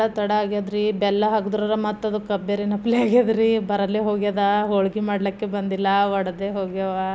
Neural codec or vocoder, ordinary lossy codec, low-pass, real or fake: none; none; none; real